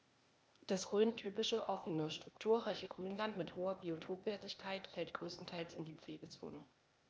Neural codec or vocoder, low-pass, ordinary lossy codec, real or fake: codec, 16 kHz, 0.8 kbps, ZipCodec; none; none; fake